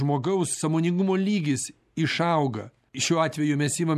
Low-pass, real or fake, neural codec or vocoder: 14.4 kHz; real; none